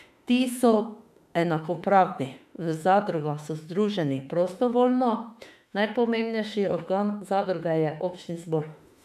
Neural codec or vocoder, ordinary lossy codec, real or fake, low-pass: autoencoder, 48 kHz, 32 numbers a frame, DAC-VAE, trained on Japanese speech; none; fake; 14.4 kHz